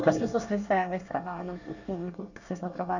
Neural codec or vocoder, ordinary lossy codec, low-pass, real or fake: codec, 24 kHz, 1 kbps, SNAC; none; 7.2 kHz; fake